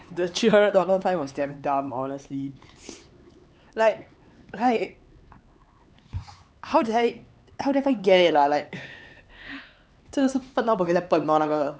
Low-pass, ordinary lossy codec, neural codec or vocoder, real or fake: none; none; codec, 16 kHz, 4 kbps, X-Codec, HuBERT features, trained on LibriSpeech; fake